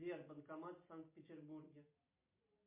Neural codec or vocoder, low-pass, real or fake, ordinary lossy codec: none; 3.6 kHz; real; MP3, 24 kbps